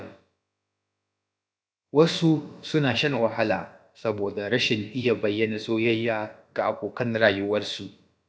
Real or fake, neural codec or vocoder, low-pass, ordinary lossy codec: fake; codec, 16 kHz, about 1 kbps, DyCAST, with the encoder's durations; none; none